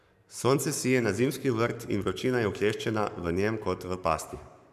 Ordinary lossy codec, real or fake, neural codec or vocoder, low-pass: none; fake; codec, 44.1 kHz, 7.8 kbps, Pupu-Codec; 14.4 kHz